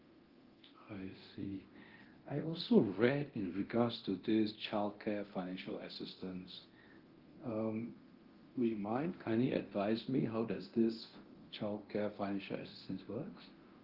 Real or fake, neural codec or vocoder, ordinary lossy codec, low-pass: fake; codec, 24 kHz, 0.9 kbps, DualCodec; Opus, 16 kbps; 5.4 kHz